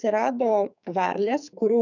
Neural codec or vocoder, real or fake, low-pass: codec, 44.1 kHz, 2.6 kbps, SNAC; fake; 7.2 kHz